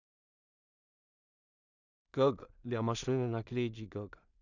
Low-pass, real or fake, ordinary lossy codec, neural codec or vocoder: 7.2 kHz; fake; Opus, 64 kbps; codec, 16 kHz in and 24 kHz out, 0.4 kbps, LongCat-Audio-Codec, two codebook decoder